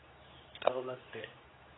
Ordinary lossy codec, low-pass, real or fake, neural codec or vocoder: AAC, 16 kbps; 7.2 kHz; fake; codec, 24 kHz, 0.9 kbps, WavTokenizer, medium speech release version 2